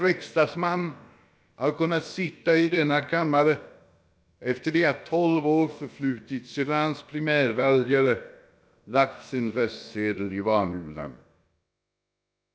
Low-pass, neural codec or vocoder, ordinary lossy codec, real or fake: none; codec, 16 kHz, about 1 kbps, DyCAST, with the encoder's durations; none; fake